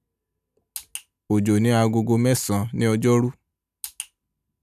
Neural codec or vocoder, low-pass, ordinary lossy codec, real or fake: none; 14.4 kHz; none; real